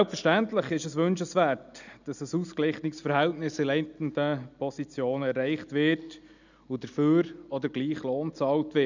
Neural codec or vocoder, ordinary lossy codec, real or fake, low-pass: none; none; real; 7.2 kHz